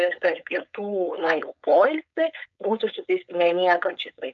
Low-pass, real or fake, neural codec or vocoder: 7.2 kHz; fake; codec, 16 kHz, 4.8 kbps, FACodec